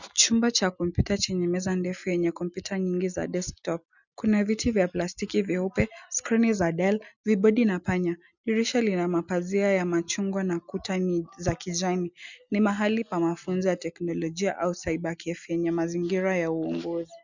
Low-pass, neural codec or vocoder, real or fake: 7.2 kHz; none; real